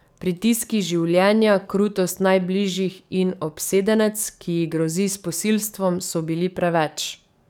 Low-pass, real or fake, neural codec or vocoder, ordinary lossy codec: 19.8 kHz; fake; codec, 44.1 kHz, 7.8 kbps, DAC; none